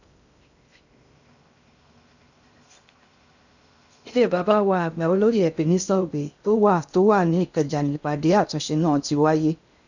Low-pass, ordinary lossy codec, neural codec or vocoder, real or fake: 7.2 kHz; none; codec, 16 kHz in and 24 kHz out, 0.6 kbps, FocalCodec, streaming, 2048 codes; fake